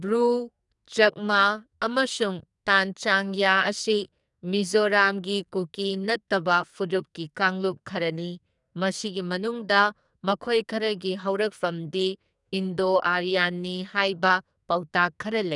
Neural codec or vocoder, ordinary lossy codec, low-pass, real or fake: codec, 44.1 kHz, 2.6 kbps, SNAC; none; 10.8 kHz; fake